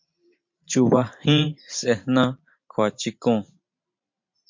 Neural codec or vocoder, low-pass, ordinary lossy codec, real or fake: vocoder, 44.1 kHz, 128 mel bands every 512 samples, BigVGAN v2; 7.2 kHz; MP3, 48 kbps; fake